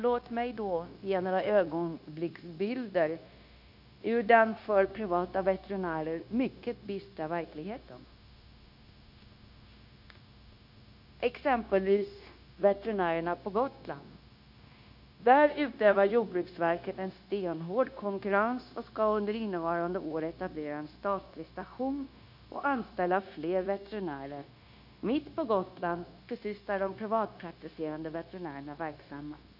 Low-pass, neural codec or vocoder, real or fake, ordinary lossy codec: 5.4 kHz; codec, 16 kHz, 0.9 kbps, LongCat-Audio-Codec; fake; none